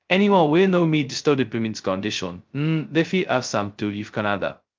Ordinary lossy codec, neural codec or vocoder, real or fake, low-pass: Opus, 24 kbps; codec, 16 kHz, 0.2 kbps, FocalCodec; fake; 7.2 kHz